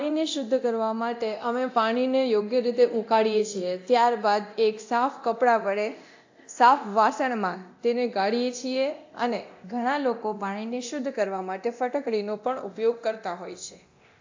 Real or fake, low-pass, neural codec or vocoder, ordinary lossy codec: fake; 7.2 kHz; codec, 24 kHz, 0.9 kbps, DualCodec; AAC, 48 kbps